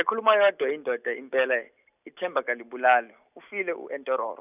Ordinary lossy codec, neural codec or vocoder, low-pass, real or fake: none; none; 3.6 kHz; real